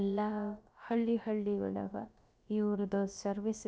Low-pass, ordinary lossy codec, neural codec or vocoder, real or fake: none; none; codec, 16 kHz, about 1 kbps, DyCAST, with the encoder's durations; fake